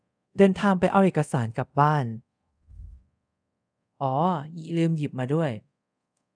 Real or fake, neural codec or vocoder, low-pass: fake; codec, 24 kHz, 0.5 kbps, DualCodec; 9.9 kHz